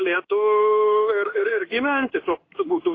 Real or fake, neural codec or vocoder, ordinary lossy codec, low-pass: fake; codec, 16 kHz in and 24 kHz out, 1 kbps, XY-Tokenizer; AAC, 32 kbps; 7.2 kHz